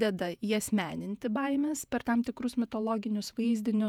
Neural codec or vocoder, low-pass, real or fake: vocoder, 48 kHz, 128 mel bands, Vocos; 19.8 kHz; fake